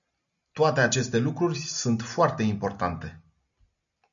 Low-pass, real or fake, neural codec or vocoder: 7.2 kHz; real; none